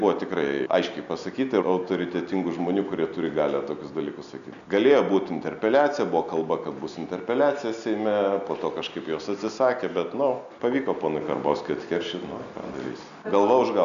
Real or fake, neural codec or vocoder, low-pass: real; none; 7.2 kHz